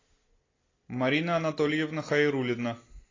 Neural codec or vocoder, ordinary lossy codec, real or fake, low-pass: none; AAC, 32 kbps; real; 7.2 kHz